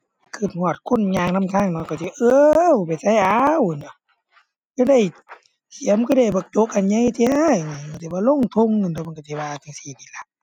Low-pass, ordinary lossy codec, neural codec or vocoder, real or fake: 19.8 kHz; none; none; real